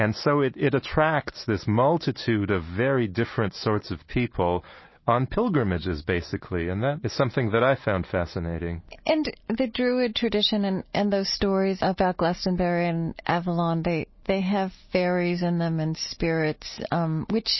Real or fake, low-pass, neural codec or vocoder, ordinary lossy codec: real; 7.2 kHz; none; MP3, 24 kbps